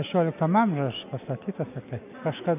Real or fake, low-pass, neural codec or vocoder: real; 3.6 kHz; none